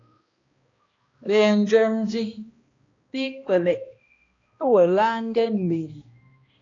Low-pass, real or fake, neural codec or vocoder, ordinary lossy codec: 7.2 kHz; fake; codec, 16 kHz, 1 kbps, X-Codec, HuBERT features, trained on balanced general audio; AAC, 32 kbps